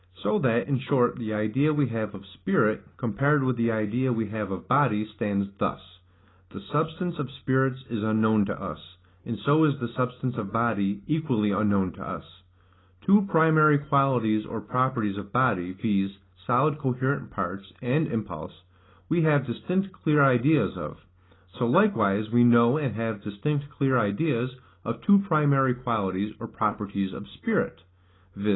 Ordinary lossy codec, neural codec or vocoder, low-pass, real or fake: AAC, 16 kbps; none; 7.2 kHz; real